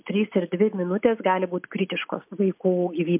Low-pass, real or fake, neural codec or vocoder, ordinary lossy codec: 3.6 kHz; real; none; MP3, 32 kbps